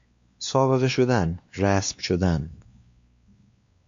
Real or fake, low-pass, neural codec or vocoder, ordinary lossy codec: fake; 7.2 kHz; codec, 16 kHz, 2 kbps, X-Codec, WavLM features, trained on Multilingual LibriSpeech; MP3, 48 kbps